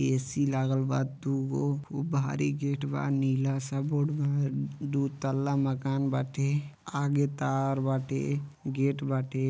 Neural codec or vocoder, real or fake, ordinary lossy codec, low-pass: none; real; none; none